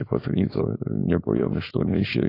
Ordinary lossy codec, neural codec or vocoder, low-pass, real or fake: AAC, 24 kbps; codec, 16 kHz, 4.8 kbps, FACodec; 5.4 kHz; fake